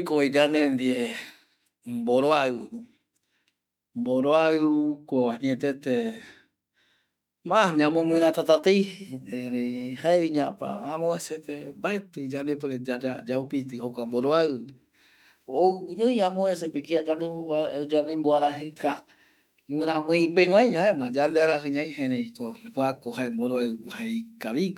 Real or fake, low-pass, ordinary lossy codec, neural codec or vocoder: fake; 19.8 kHz; none; autoencoder, 48 kHz, 32 numbers a frame, DAC-VAE, trained on Japanese speech